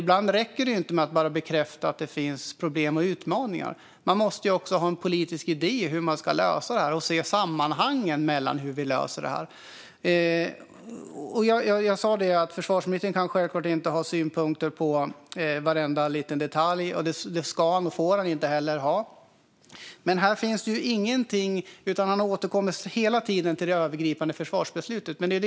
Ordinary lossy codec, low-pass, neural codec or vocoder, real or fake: none; none; none; real